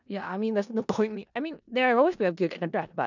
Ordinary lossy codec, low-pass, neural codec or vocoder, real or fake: none; 7.2 kHz; codec, 16 kHz in and 24 kHz out, 0.4 kbps, LongCat-Audio-Codec, four codebook decoder; fake